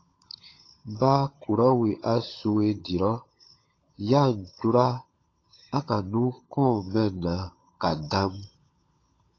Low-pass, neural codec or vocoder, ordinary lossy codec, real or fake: 7.2 kHz; codec, 16 kHz, 16 kbps, FunCodec, trained on LibriTTS, 50 frames a second; AAC, 32 kbps; fake